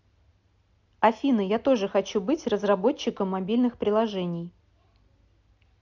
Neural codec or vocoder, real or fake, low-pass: none; real; 7.2 kHz